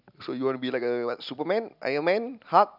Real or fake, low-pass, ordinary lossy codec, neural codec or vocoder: real; 5.4 kHz; none; none